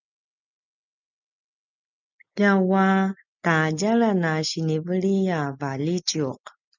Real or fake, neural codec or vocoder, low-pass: real; none; 7.2 kHz